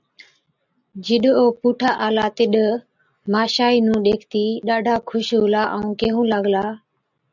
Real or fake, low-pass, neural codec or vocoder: real; 7.2 kHz; none